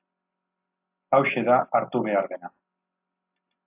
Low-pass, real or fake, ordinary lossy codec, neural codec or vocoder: 3.6 kHz; real; AAC, 24 kbps; none